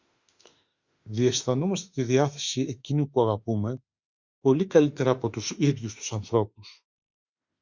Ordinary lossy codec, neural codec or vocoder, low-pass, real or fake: Opus, 64 kbps; autoencoder, 48 kHz, 32 numbers a frame, DAC-VAE, trained on Japanese speech; 7.2 kHz; fake